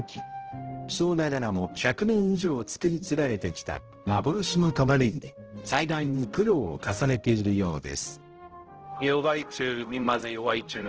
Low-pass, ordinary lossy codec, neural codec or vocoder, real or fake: 7.2 kHz; Opus, 16 kbps; codec, 16 kHz, 0.5 kbps, X-Codec, HuBERT features, trained on balanced general audio; fake